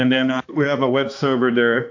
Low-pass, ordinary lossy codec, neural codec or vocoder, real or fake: 7.2 kHz; AAC, 48 kbps; codec, 16 kHz, 2 kbps, X-Codec, HuBERT features, trained on balanced general audio; fake